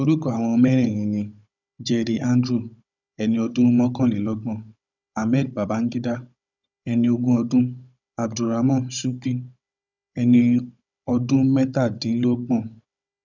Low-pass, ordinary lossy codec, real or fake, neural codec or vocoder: 7.2 kHz; none; fake; codec, 16 kHz, 16 kbps, FunCodec, trained on Chinese and English, 50 frames a second